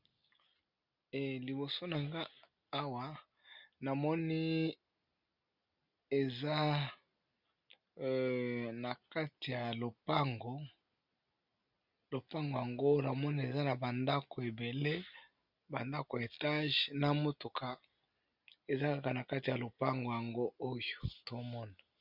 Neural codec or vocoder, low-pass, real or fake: none; 5.4 kHz; real